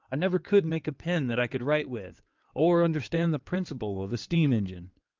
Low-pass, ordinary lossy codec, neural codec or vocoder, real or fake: 7.2 kHz; Opus, 24 kbps; codec, 16 kHz in and 24 kHz out, 2.2 kbps, FireRedTTS-2 codec; fake